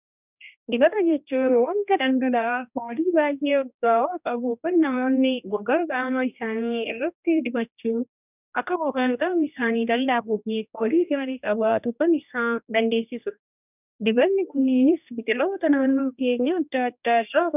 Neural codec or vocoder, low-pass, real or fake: codec, 16 kHz, 1 kbps, X-Codec, HuBERT features, trained on general audio; 3.6 kHz; fake